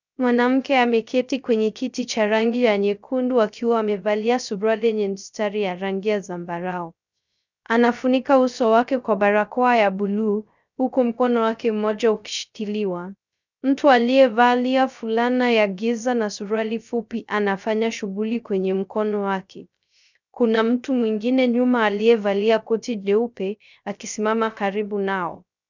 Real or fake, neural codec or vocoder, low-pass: fake; codec, 16 kHz, 0.3 kbps, FocalCodec; 7.2 kHz